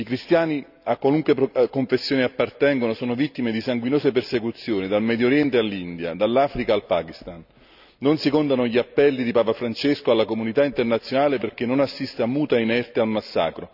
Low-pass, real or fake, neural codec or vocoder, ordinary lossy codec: 5.4 kHz; real; none; none